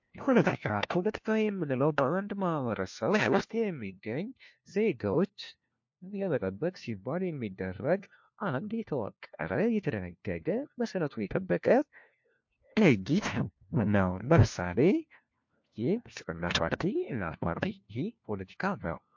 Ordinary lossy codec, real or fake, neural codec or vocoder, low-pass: MP3, 48 kbps; fake; codec, 16 kHz, 1 kbps, FunCodec, trained on LibriTTS, 50 frames a second; 7.2 kHz